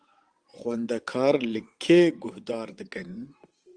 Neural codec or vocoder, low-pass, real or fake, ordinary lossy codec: vocoder, 22.05 kHz, 80 mel bands, WaveNeXt; 9.9 kHz; fake; Opus, 24 kbps